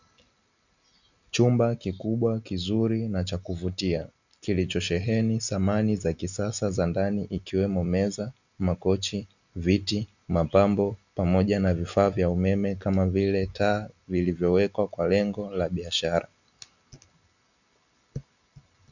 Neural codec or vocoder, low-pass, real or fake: none; 7.2 kHz; real